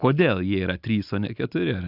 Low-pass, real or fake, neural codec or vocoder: 5.4 kHz; real; none